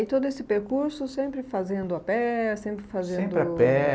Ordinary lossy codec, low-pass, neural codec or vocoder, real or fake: none; none; none; real